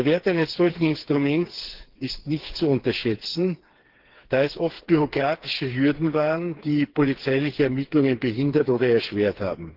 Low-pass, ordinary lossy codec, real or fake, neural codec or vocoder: 5.4 kHz; Opus, 16 kbps; fake; codec, 16 kHz, 4 kbps, FreqCodec, smaller model